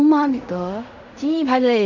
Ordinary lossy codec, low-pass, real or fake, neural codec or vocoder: none; 7.2 kHz; fake; codec, 16 kHz in and 24 kHz out, 0.4 kbps, LongCat-Audio-Codec, fine tuned four codebook decoder